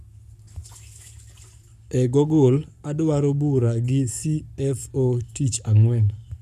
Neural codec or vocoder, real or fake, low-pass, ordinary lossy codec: codec, 44.1 kHz, 7.8 kbps, Pupu-Codec; fake; 14.4 kHz; none